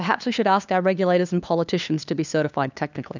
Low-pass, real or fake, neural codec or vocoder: 7.2 kHz; fake; codec, 16 kHz, 2 kbps, FunCodec, trained on LibriTTS, 25 frames a second